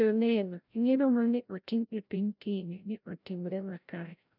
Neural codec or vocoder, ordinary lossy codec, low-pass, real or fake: codec, 16 kHz, 0.5 kbps, FreqCodec, larger model; none; 5.4 kHz; fake